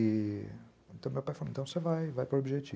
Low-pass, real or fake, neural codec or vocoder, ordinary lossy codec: none; real; none; none